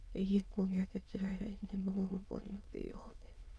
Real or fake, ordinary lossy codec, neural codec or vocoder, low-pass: fake; none; autoencoder, 22.05 kHz, a latent of 192 numbers a frame, VITS, trained on many speakers; none